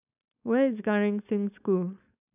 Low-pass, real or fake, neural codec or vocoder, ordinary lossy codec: 3.6 kHz; fake; codec, 16 kHz, 4.8 kbps, FACodec; none